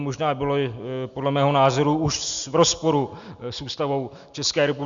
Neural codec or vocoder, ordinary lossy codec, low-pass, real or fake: none; Opus, 64 kbps; 7.2 kHz; real